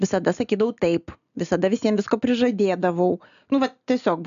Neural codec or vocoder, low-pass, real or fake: none; 7.2 kHz; real